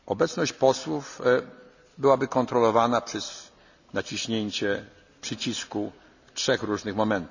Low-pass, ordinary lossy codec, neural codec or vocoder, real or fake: 7.2 kHz; none; none; real